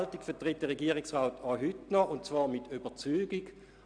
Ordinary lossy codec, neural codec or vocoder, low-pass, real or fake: none; none; 9.9 kHz; real